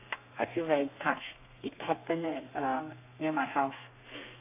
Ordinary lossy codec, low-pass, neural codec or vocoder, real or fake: AAC, 24 kbps; 3.6 kHz; codec, 32 kHz, 1.9 kbps, SNAC; fake